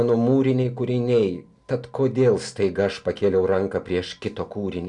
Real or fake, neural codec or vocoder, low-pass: real; none; 10.8 kHz